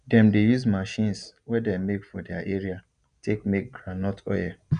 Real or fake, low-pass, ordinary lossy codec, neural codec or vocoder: real; 9.9 kHz; none; none